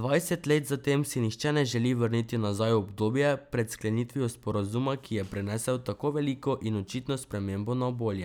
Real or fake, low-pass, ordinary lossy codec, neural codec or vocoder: real; 19.8 kHz; none; none